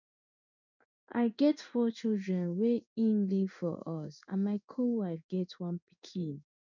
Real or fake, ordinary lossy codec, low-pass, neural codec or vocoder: fake; none; 7.2 kHz; codec, 16 kHz in and 24 kHz out, 1 kbps, XY-Tokenizer